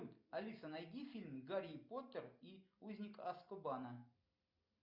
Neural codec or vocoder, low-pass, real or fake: none; 5.4 kHz; real